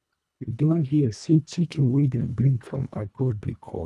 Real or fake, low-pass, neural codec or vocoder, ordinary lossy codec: fake; none; codec, 24 kHz, 1.5 kbps, HILCodec; none